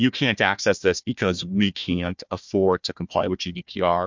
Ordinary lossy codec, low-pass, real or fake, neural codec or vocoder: MP3, 64 kbps; 7.2 kHz; fake; codec, 16 kHz, 1 kbps, FunCodec, trained on Chinese and English, 50 frames a second